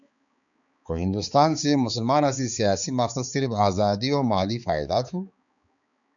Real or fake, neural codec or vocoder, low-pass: fake; codec, 16 kHz, 4 kbps, X-Codec, HuBERT features, trained on balanced general audio; 7.2 kHz